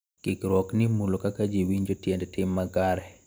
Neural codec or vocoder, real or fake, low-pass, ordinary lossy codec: none; real; none; none